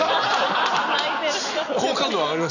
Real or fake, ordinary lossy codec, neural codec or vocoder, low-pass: real; none; none; 7.2 kHz